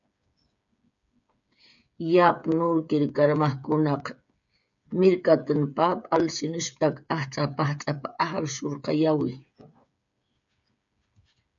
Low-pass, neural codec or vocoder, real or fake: 7.2 kHz; codec, 16 kHz, 8 kbps, FreqCodec, smaller model; fake